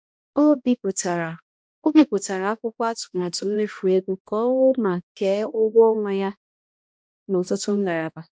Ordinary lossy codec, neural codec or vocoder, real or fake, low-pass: none; codec, 16 kHz, 1 kbps, X-Codec, HuBERT features, trained on balanced general audio; fake; none